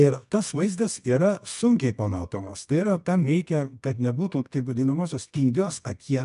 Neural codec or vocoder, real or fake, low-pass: codec, 24 kHz, 0.9 kbps, WavTokenizer, medium music audio release; fake; 10.8 kHz